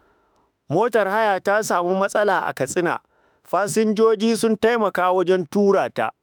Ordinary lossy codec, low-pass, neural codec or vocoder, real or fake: none; none; autoencoder, 48 kHz, 32 numbers a frame, DAC-VAE, trained on Japanese speech; fake